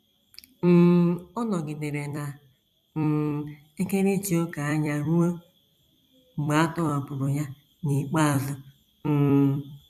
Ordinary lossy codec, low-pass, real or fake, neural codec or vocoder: none; 14.4 kHz; fake; vocoder, 44.1 kHz, 128 mel bands every 256 samples, BigVGAN v2